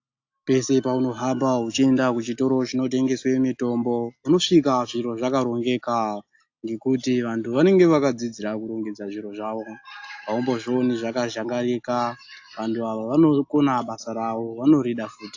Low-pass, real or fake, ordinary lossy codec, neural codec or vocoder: 7.2 kHz; real; AAC, 48 kbps; none